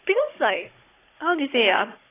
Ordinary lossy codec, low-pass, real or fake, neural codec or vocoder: AAC, 16 kbps; 3.6 kHz; fake; codec, 16 kHz, 16 kbps, FunCodec, trained on Chinese and English, 50 frames a second